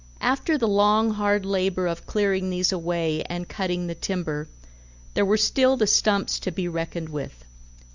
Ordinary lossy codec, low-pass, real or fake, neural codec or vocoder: Opus, 64 kbps; 7.2 kHz; real; none